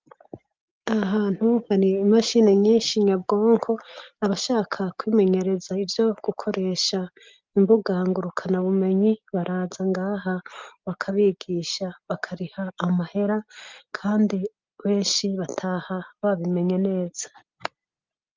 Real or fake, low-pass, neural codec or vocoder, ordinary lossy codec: real; 7.2 kHz; none; Opus, 32 kbps